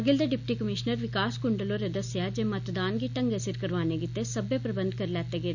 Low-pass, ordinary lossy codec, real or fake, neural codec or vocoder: 7.2 kHz; none; real; none